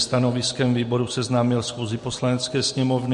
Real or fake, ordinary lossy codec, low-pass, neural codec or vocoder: real; MP3, 48 kbps; 10.8 kHz; none